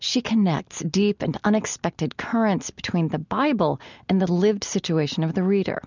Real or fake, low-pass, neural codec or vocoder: real; 7.2 kHz; none